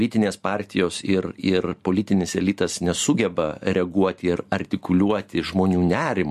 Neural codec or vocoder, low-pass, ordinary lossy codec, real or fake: none; 14.4 kHz; MP3, 64 kbps; real